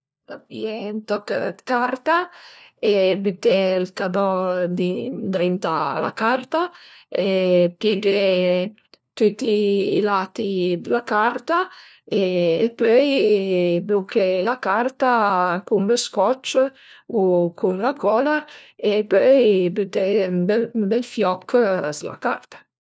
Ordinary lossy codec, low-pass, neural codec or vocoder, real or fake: none; none; codec, 16 kHz, 1 kbps, FunCodec, trained on LibriTTS, 50 frames a second; fake